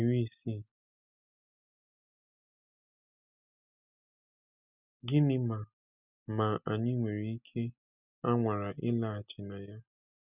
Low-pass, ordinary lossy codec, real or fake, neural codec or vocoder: 3.6 kHz; none; real; none